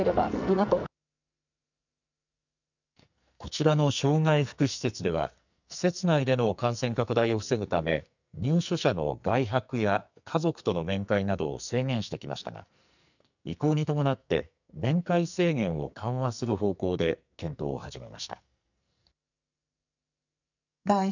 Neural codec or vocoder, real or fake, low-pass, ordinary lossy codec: codec, 44.1 kHz, 2.6 kbps, SNAC; fake; 7.2 kHz; none